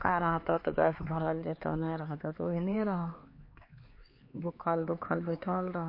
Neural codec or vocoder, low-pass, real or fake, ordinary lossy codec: codec, 16 kHz, 4 kbps, X-Codec, HuBERT features, trained on LibriSpeech; 5.4 kHz; fake; MP3, 32 kbps